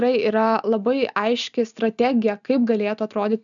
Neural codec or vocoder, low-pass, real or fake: none; 7.2 kHz; real